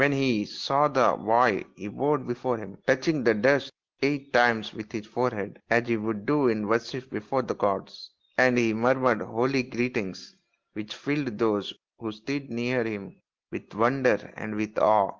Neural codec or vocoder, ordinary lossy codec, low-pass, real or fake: none; Opus, 16 kbps; 7.2 kHz; real